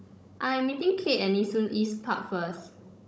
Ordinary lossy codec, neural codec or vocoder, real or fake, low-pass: none; codec, 16 kHz, 16 kbps, FunCodec, trained on Chinese and English, 50 frames a second; fake; none